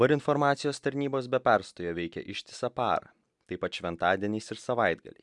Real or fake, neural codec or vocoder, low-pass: real; none; 10.8 kHz